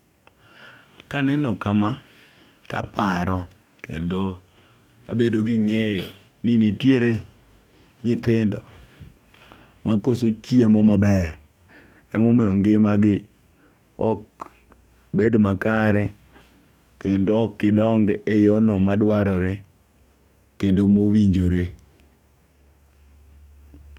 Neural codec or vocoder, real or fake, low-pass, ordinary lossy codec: codec, 44.1 kHz, 2.6 kbps, DAC; fake; 19.8 kHz; none